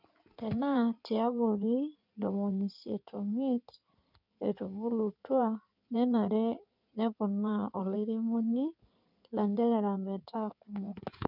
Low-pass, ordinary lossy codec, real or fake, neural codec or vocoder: 5.4 kHz; none; fake; codec, 16 kHz in and 24 kHz out, 2.2 kbps, FireRedTTS-2 codec